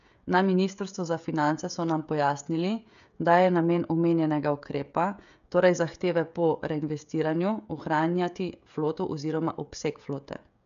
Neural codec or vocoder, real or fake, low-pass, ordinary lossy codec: codec, 16 kHz, 16 kbps, FreqCodec, smaller model; fake; 7.2 kHz; MP3, 96 kbps